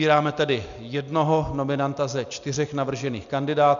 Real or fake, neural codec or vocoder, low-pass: real; none; 7.2 kHz